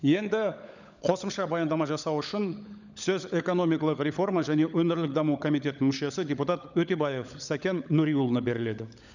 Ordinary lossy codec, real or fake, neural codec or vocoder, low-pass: none; fake; codec, 16 kHz, 16 kbps, FunCodec, trained on LibriTTS, 50 frames a second; 7.2 kHz